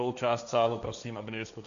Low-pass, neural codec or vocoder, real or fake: 7.2 kHz; codec, 16 kHz, 1.1 kbps, Voila-Tokenizer; fake